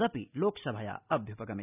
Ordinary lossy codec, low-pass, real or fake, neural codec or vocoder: none; 3.6 kHz; real; none